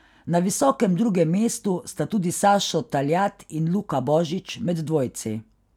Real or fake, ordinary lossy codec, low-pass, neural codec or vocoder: real; none; 19.8 kHz; none